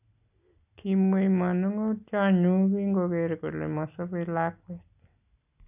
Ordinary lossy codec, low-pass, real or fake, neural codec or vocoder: none; 3.6 kHz; real; none